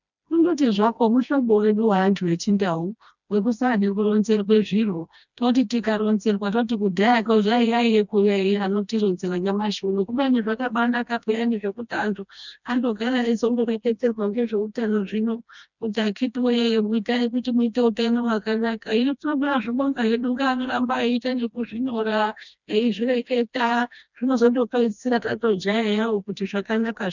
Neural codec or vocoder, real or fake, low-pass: codec, 16 kHz, 1 kbps, FreqCodec, smaller model; fake; 7.2 kHz